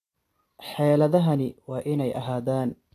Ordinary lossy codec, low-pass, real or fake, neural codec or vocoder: AAC, 48 kbps; 14.4 kHz; real; none